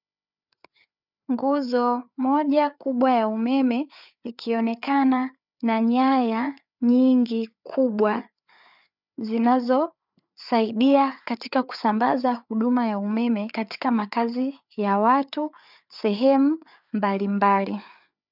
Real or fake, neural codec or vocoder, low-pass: fake; codec, 16 kHz, 4 kbps, FreqCodec, larger model; 5.4 kHz